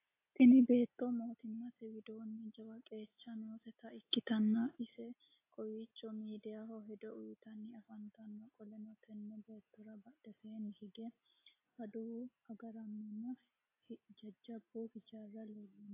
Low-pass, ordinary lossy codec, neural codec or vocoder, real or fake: 3.6 kHz; AAC, 24 kbps; none; real